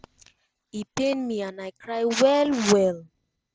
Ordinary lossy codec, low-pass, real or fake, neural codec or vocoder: none; none; real; none